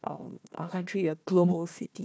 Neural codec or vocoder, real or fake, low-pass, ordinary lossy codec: codec, 16 kHz, 1 kbps, FunCodec, trained on Chinese and English, 50 frames a second; fake; none; none